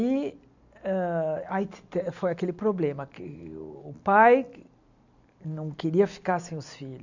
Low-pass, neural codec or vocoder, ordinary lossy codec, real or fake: 7.2 kHz; none; none; real